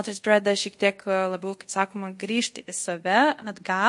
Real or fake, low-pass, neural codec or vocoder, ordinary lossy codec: fake; 10.8 kHz; codec, 24 kHz, 0.5 kbps, DualCodec; MP3, 48 kbps